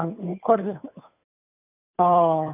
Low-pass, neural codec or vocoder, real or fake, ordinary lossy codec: 3.6 kHz; codec, 16 kHz, 2 kbps, FunCodec, trained on Chinese and English, 25 frames a second; fake; none